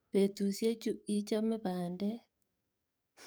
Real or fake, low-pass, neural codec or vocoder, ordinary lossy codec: fake; none; codec, 44.1 kHz, 7.8 kbps, DAC; none